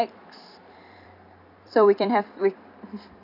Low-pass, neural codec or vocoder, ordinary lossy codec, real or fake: 5.4 kHz; vocoder, 44.1 kHz, 128 mel bands every 256 samples, BigVGAN v2; none; fake